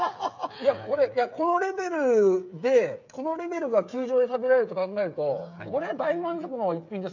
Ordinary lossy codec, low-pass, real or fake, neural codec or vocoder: none; 7.2 kHz; fake; codec, 16 kHz, 8 kbps, FreqCodec, smaller model